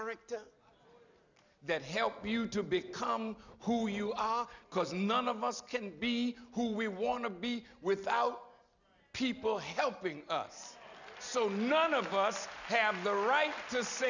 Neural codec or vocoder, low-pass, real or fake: none; 7.2 kHz; real